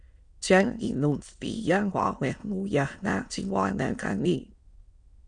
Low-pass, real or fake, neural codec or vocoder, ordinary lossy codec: 9.9 kHz; fake; autoencoder, 22.05 kHz, a latent of 192 numbers a frame, VITS, trained on many speakers; Opus, 64 kbps